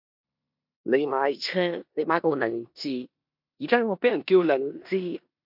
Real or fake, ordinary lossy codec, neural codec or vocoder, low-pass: fake; MP3, 48 kbps; codec, 16 kHz in and 24 kHz out, 0.9 kbps, LongCat-Audio-Codec, four codebook decoder; 5.4 kHz